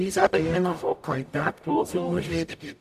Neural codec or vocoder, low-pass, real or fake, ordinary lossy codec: codec, 44.1 kHz, 0.9 kbps, DAC; 14.4 kHz; fake; none